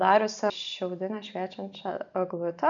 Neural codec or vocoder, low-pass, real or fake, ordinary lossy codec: none; 7.2 kHz; real; MP3, 96 kbps